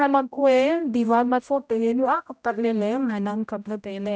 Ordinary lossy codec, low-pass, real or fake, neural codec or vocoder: none; none; fake; codec, 16 kHz, 0.5 kbps, X-Codec, HuBERT features, trained on general audio